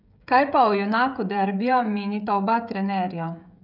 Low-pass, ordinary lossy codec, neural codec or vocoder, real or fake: 5.4 kHz; none; codec, 16 kHz, 16 kbps, FreqCodec, smaller model; fake